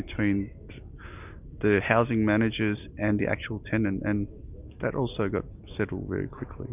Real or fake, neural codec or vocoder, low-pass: real; none; 3.6 kHz